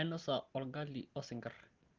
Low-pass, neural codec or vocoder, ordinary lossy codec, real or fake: 7.2 kHz; none; Opus, 16 kbps; real